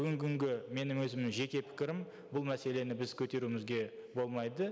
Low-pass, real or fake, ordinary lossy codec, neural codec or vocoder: none; real; none; none